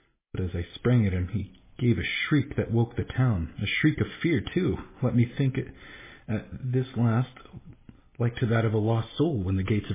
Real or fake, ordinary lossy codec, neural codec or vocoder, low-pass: real; MP3, 16 kbps; none; 3.6 kHz